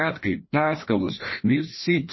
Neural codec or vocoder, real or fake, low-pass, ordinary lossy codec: codec, 16 kHz, 1 kbps, FreqCodec, larger model; fake; 7.2 kHz; MP3, 24 kbps